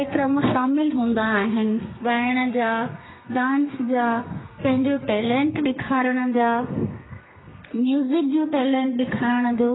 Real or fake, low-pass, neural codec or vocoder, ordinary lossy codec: fake; 7.2 kHz; codec, 32 kHz, 1.9 kbps, SNAC; AAC, 16 kbps